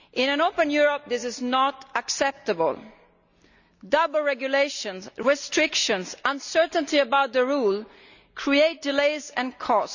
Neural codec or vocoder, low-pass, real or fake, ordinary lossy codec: none; 7.2 kHz; real; none